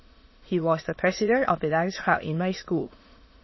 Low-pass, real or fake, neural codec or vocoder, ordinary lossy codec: 7.2 kHz; fake; autoencoder, 22.05 kHz, a latent of 192 numbers a frame, VITS, trained on many speakers; MP3, 24 kbps